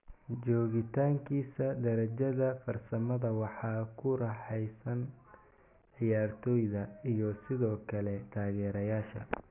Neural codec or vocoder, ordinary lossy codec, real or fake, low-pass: none; none; real; 3.6 kHz